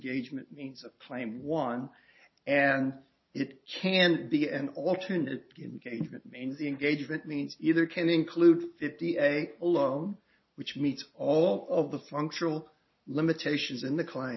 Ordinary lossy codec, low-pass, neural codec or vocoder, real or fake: MP3, 24 kbps; 7.2 kHz; none; real